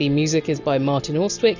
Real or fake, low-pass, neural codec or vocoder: real; 7.2 kHz; none